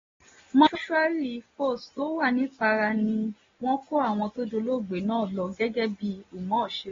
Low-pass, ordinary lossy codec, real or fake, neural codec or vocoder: 7.2 kHz; AAC, 24 kbps; real; none